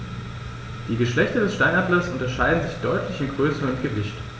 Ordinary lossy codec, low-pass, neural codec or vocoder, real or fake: none; none; none; real